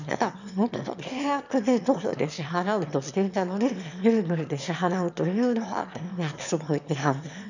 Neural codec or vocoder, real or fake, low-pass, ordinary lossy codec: autoencoder, 22.05 kHz, a latent of 192 numbers a frame, VITS, trained on one speaker; fake; 7.2 kHz; none